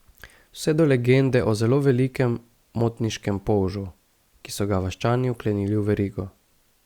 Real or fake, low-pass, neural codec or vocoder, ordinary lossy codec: real; 19.8 kHz; none; Opus, 64 kbps